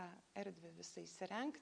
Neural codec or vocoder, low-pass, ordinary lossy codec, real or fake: none; 9.9 kHz; MP3, 96 kbps; real